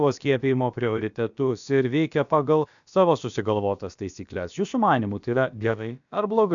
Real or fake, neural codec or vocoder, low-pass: fake; codec, 16 kHz, about 1 kbps, DyCAST, with the encoder's durations; 7.2 kHz